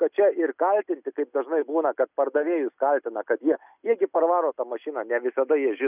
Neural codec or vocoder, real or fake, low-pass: none; real; 3.6 kHz